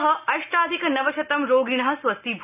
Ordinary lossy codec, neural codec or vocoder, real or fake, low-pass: none; none; real; 3.6 kHz